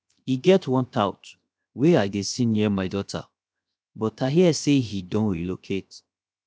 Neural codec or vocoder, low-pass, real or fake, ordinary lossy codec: codec, 16 kHz, 0.7 kbps, FocalCodec; none; fake; none